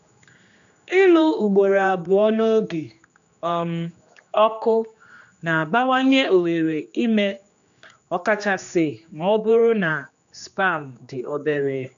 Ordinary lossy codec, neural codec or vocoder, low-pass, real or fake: AAC, 64 kbps; codec, 16 kHz, 2 kbps, X-Codec, HuBERT features, trained on general audio; 7.2 kHz; fake